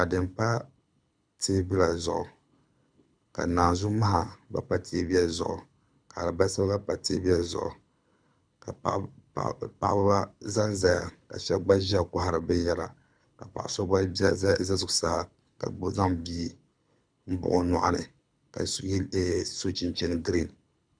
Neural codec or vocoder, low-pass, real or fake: codec, 24 kHz, 6 kbps, HILCodec; 9.9 kHz; fake